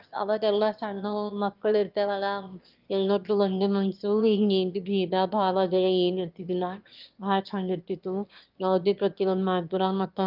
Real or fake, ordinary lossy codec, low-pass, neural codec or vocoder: fake; Opus, 32 kbps; 5.4 kHz; autoencoder, 22.05 kHz, a latent of 192 numbers a frame, VITS, trained on one speaker